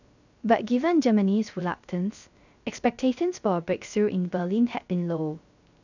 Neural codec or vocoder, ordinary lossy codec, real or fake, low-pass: codec, 16 kHz, 0.3 kbps, FocalCodec; none; fake; 7.2 kHz